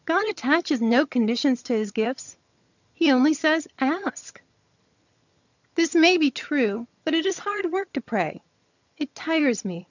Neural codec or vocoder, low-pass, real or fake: vocoder, 22.05 kHz, 80 mel bands, HiFi-GAN; 7.2 kHz; fake